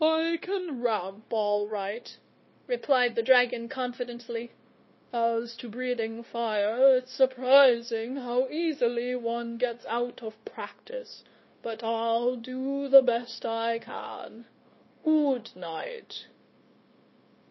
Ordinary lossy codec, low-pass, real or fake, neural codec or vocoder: MP3, 24 kbps; 7.2 kHz; fake; codec, 16 kHz, 0.9 kbps, LongCat-Audio-Codec